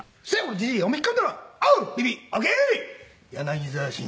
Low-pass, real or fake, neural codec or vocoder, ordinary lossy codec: none; real; none; none